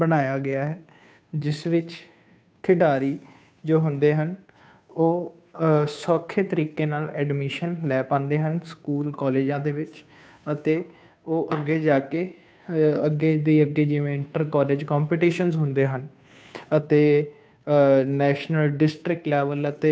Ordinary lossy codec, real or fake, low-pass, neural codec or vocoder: none; fake; none; codec, 16 kHz, 2 kbps, FunCodec, trained on Chinese and English, 25 frames a second